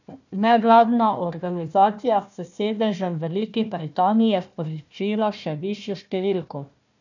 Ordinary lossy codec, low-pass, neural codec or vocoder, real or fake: none; 7.2 kHz; codec, 16 kHz, 1 kbps, FunCodec, trained on Chinese and English, 50 frames a second; fake